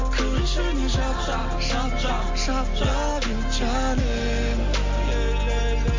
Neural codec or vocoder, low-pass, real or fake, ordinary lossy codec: none; 7.2 kHz; real; MP3, 48 kbps